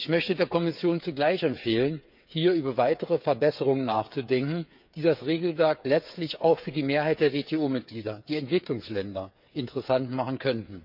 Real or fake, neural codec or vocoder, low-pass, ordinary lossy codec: fake; codec, 16 kHz, 8 kbps, FreqCodec, smaller model; 5.4 kHz; none